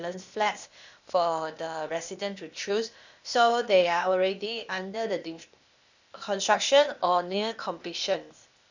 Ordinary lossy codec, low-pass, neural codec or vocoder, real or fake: none; 7.2 kHz; codec, 16 kHz, 0.8 kbps, ZipCodec; fake